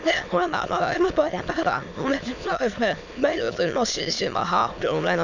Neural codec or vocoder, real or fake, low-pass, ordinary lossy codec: autoencoder, 22.05 kHz, a latent of 192 numbers a frame, VITS, trained on many speakers; fake; 7.2 kHz; none